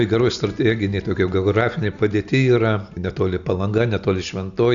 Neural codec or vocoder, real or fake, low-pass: none; real; 7.2 kHz